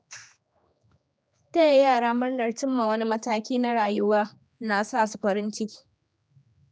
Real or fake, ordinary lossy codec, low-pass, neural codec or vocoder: fake; none; none; codec, 16 kHz, 2 kbps, X-Codec, HuBERT features, trained on general audio